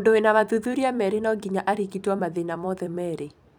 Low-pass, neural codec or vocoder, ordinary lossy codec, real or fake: 19.8 kHz; vocoder, 44.1 kHz, 128 mel bands every 256 samples, BigVGAN v2; none; fake